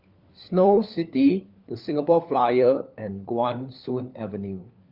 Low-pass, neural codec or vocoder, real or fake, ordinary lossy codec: 5.4 kHz; codec, 16 kHz, 4 kbps, FunCodec, trained on LibriTTS, 50 frames a second; fake; Opus, 32 kbps